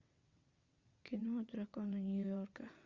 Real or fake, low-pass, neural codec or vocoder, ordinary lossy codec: fake; 7.2 kHz; vocoder, 22.05 kHz, 80 mel bands, WaveNeXt; Opus, 64 kbps